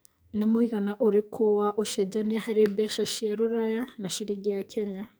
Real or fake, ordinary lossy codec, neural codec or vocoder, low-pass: fake; none; codec, 44.1 kHz, 2.6 kbps, SNAC; none